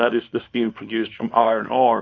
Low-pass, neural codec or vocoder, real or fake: 7.2 kHz; codec, 24 kHz, 0.9 kbps, WavTokenizer, small release; fake